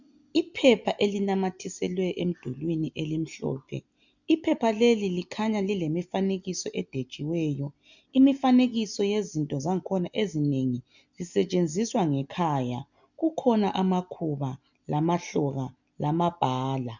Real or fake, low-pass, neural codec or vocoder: real; 7.2 kHz; none